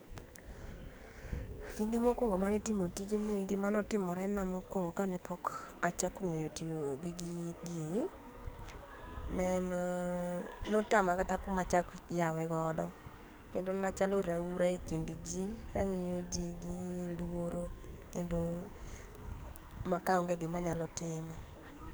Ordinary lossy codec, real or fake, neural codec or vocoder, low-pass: none; fake; codec, 44.1 kHz, 2.6 kbps, SNAC; none